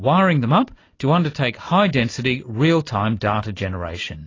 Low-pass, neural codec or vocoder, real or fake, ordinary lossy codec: 7.2 kHz; none; real; AAC, 32 kbps